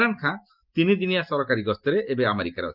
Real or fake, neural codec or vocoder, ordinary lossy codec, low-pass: real; none; Opus, 24 kbps; 5.4 kHz